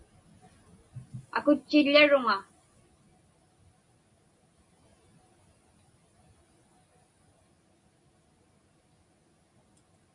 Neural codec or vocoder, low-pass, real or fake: none; 10.8 kHz; real